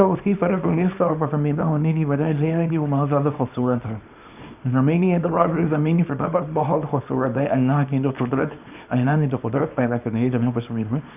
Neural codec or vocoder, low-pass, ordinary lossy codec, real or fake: codec, 24 kHz, 0.9 kbps, WavTokenizer, small release; 3.6 kHz; none; fake